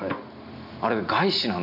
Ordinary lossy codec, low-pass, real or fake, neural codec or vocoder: none; 5.4 kHz; real; none